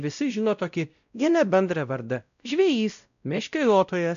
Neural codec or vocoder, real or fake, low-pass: codec, 16 kHz, 0.5 kbps, X-Codec, WavLM features, trained on Multilingual LibriSpeech; fake; 7.2 kHz